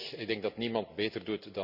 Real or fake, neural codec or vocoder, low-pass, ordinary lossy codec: real; none; 5.4 kHz; none